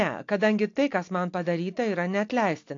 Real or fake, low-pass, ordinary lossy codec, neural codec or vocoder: real; 7.2 kHz; AAC, 48 kbps; none